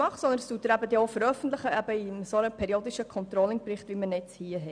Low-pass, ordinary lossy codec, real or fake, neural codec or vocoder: 9.9 kHz; none; real; none